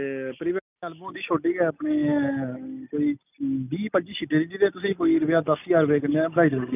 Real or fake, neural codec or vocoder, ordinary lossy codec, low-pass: real; none; none; 3.6 kHz